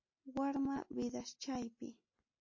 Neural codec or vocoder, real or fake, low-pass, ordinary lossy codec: none; real; 7.2 kHz; MP3, 32 kbps